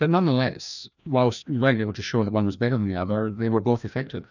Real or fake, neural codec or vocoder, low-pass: fake; codec, 16 kHz, 1 kbps, FreqCodec, larger model; 7.2 kHz